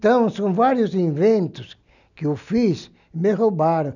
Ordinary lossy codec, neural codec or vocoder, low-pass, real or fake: none; none; 7.2 kHz; real